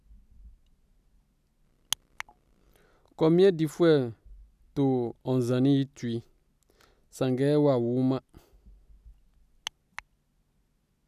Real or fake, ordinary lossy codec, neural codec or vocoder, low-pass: real; none; none; 14.4 kHz